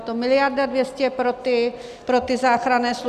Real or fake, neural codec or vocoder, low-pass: real; none; 14.4 kHz